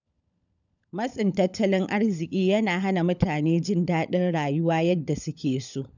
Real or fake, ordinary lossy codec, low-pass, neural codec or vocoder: fake; none; 7.2 kHz; codec, 16 kHz, 16 kbps, FunCodec, trained on LibriTTS, 50 frames a second